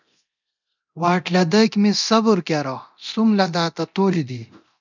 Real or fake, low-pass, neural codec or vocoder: fake; 7.2 kHz; codec, 24 kHz, 0.9 kbps, DualCodec